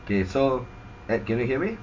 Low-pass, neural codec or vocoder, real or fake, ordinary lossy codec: 7.2 kHz; none; real; MP3, 48 kbps